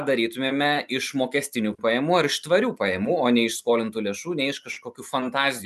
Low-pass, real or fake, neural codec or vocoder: 14.4 kHz; real; none